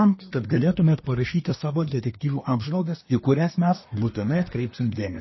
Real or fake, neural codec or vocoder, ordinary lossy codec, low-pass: fake; codec, 24 kHz, 1 kbps, SNAC; MP3, 24 kbps; 7.2 kHz